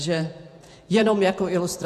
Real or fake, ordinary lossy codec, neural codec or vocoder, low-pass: fake; MP3, 64 kbps; vocoder, 48 kHz, 128 mel bands, Vocos; 14.4 kHz